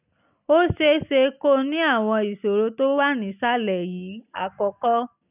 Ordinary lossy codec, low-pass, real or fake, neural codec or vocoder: none; 3.6 kHz; real; none